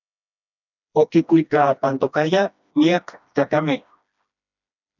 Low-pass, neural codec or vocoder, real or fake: 7.2 kHz; codec, 16 kHz, 1 kbps, FreqCodec, smaller model; fake